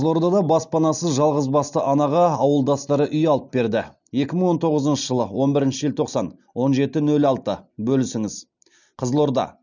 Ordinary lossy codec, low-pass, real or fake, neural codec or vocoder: none; 7.2 kHz; real; none